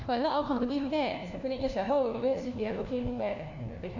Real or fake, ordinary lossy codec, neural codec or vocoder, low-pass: fake; none; codec, 16 kHz, 1 kbps, FunCodec, trained on LibriTTS, 50 frames a second; 7.2 kHz